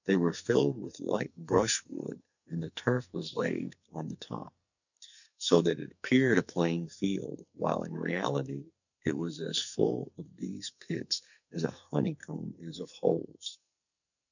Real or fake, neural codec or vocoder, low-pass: fake; codec, 44.1 kHz, 2.6 kbps, SNAC; 7.2 kHz